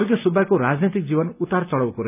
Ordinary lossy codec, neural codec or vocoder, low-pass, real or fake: none; none; 3.6 kHz; real